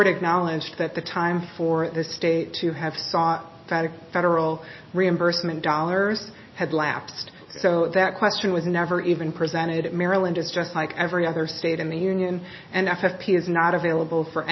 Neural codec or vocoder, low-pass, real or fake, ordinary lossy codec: none; 7.2 kHz; real; MP3, 24 kbps